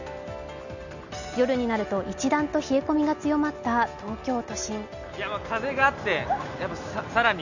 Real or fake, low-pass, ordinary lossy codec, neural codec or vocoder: real; 7.2 kHz; none; none